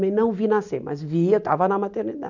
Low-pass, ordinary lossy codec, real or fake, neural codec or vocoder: 7.2 kHz; none; real; none